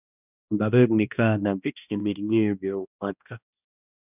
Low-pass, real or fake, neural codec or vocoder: 3.6 kHz; fake; codec, 16 kHz, 1 kbps, X-Codec, HuBERT features, trained on balanced general audio